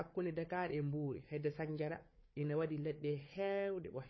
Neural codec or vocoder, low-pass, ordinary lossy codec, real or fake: codec, 16 kHz, 4 kbps, FunCodec, trained on Chinese and English, 50 frames a second; 7.2 kHz; MP3, 24 kbps; fake